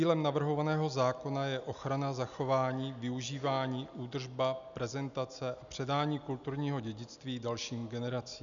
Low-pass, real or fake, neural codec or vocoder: 7.2 kHz; real; none